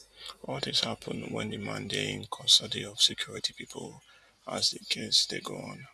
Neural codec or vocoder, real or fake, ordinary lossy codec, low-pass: none; real; none; none